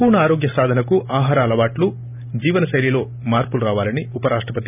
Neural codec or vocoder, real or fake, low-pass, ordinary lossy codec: none; real; 3.6 kHz; none